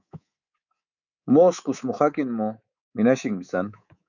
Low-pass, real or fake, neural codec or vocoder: 7.2 kHz; fake; codec, 24 kHz, 3.1 kbps, DualCodec